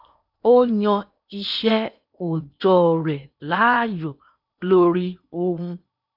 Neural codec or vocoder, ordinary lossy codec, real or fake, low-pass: codec, 16 kHz in and 24 kHz out, 0.8 kbps, FocalCodec, streaming, 65536 codes; none; fake; 5.4 kHz